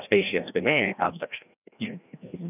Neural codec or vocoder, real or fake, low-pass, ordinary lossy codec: codec, 16 kHz, 1 kbps, FreqCodec, larger model; fake; 3.6 kHz; none